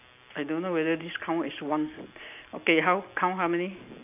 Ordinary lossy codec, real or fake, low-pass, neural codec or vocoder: none; real; 3.6 kHz; none